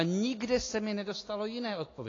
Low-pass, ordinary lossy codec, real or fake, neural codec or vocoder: 7.2 kHz; AAC, 32 kbps; real; none